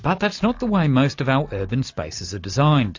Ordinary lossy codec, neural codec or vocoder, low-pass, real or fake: AAC, 48 kbps; none; 7.2 kHz; real